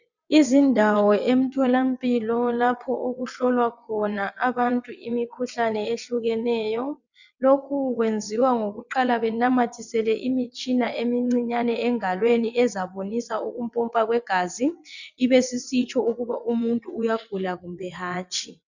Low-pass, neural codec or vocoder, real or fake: 7.2 kHz; vocoder, 22.05 kHz, 80 mel bands, WaveNeXt; fake